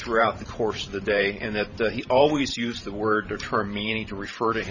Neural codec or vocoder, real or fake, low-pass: vocoder, 44.1 kHz, 128 mel bands every 512 samples, BigVGAN v2; fake; 7.2 kHz